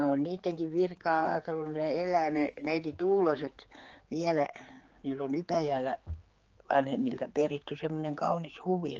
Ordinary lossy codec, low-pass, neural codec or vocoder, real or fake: Opus, 24 kbps; 7.2 kHz; codec, 16 kHz, 4 kbps, X-Codec, HuBERT features, trained on general audio; fake